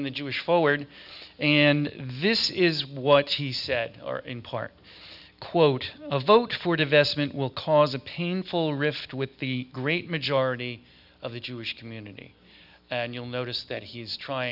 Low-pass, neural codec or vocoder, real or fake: 5.4 kHz; none; real